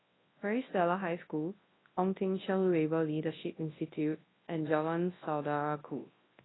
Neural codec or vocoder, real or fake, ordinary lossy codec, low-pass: codec, 24 kHz, 0.9 kbps, WavTokenizer, large speech release; fake; AAC, 16 kbps; 7.2 kHz